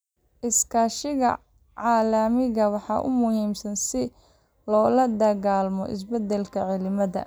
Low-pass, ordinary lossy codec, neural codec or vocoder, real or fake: none; none; none; real